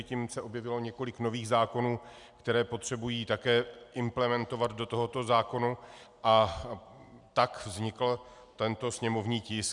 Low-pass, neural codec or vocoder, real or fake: 10.8 kHz; none; real